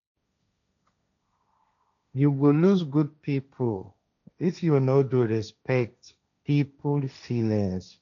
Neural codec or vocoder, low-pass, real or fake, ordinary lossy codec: codec, 16 kHz, 1.1 kbps, Voila-Tokenizer; 7.2 kHz; fake; none